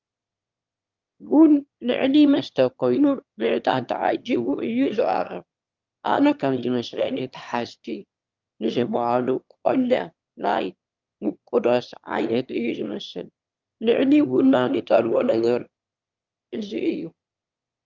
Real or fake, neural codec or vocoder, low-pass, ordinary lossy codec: fake; autoencoder, 22.05 kHz, a latent of 192 numbers a frame, VITS, trained on one speaker; 7.2 kHz; Opus, 24 kbps